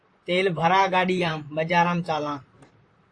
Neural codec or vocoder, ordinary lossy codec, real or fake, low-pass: vocoder, 44.1 kHz, 128 mel bands, Pupu-Vocoder; AAC, 48 kbps; fake; 9.9 kHz